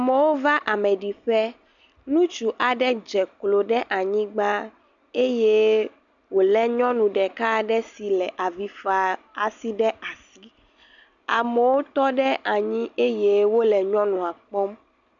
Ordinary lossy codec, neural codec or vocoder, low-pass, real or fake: MP3, 96 kbps; none; 7.2 kHz; real